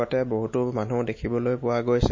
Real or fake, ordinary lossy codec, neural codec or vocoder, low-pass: real; MP3, 32 kbps; none; 7.2 kHz